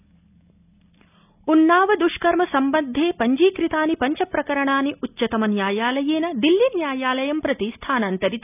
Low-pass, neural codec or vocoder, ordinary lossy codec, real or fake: 3.6 kHz; none; none; real